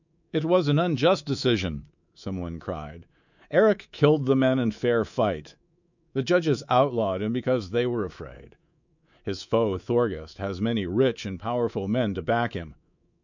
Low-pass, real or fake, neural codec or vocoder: 7.2 kHz; fake; codec, 24 kHz, 3.1 kbps, DualCodec